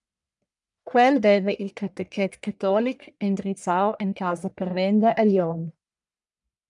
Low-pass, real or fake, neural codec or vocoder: 10.8 kHz; fake; codec, 44.1 kHz, 1.7 kbps, Pupu-Codec